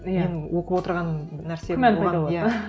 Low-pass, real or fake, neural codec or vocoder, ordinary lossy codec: none; real; none; none